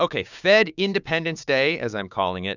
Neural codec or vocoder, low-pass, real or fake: codec, 16 kHz, 6 kbps, DAC; 7.2 kHz; fake